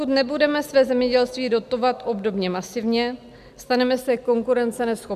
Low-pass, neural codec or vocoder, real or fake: 14.4 kHz; none; real